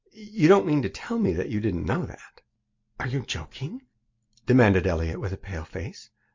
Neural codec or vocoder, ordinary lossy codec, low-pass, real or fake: none; MP3, 48 kbps; 7.2 kHz; real